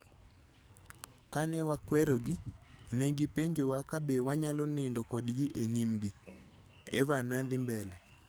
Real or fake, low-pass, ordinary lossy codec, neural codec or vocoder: fake; none; none; codec, 44.1 kHz, 2.6 kbps, SNAC